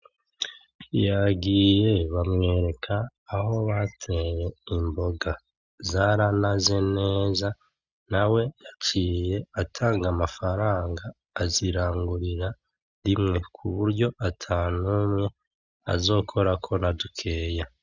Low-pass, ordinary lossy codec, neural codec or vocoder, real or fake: 7.2 kHz; Opus, 64 kbps; none; real